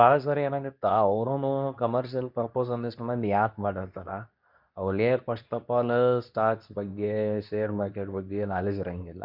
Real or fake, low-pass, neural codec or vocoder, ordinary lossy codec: fake; 5.4 kHz; codec, 24 kHz, 0.9 kbps, WavTokenizer, medium speech release version 2; none